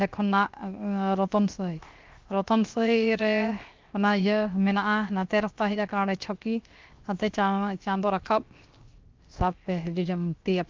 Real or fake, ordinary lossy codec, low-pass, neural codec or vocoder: fake; Opus, 24 kbps; 7.2 kHz; codec, 16 kHz, 0.7 kbps, FocalCodec